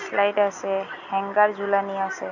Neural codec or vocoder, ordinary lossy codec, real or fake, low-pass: none; none; real; 7.2 kHz